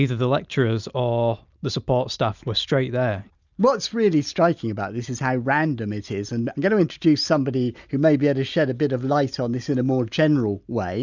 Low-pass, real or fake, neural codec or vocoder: 7.2 kHz; real; none